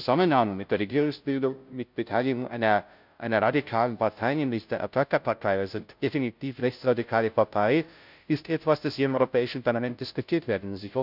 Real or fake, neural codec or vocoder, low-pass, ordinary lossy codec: fake; codec, 16 kHz, 0.5 kbps, FunCodec, trained on Chinese and English, 25 frames a second; 5.4 kHz; none